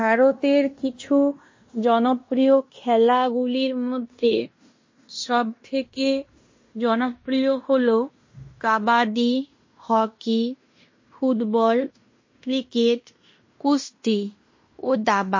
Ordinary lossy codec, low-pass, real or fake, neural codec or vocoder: MP3, 32 kbps; 7.2 kHz; fake; codec, 16 kHz in and 24 kHz out, 0.9 kbps, LongCat-Audio-Codec, fine tuned four codebook decoder